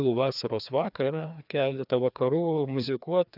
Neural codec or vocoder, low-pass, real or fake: codec, 16 kHz, 2 kbps, FreqCodec, larger model; 5.4 kHz; fake